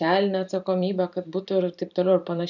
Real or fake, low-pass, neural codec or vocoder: fake; 7.2 kHz; vocoder, 44.1 kHz, 128 mel bands every 512 samples, BigVGAN v2